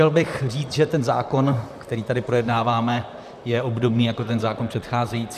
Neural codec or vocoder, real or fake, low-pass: vocoder, 44.1 kHz, 128 mel bands, Pupu-Vocoder; fake; 14.4 kHz